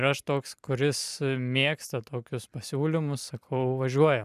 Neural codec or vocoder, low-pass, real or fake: vocoder, 44.1 kHz, 128 mel bands every 256 samples, BigVGAN v2; 14.4 kHz; fake